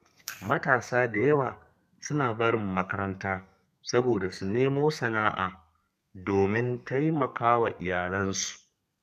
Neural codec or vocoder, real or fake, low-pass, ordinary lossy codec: codec, 32 kHz, 1.9 kbps, SNAC; fake; 14.4 kHz; none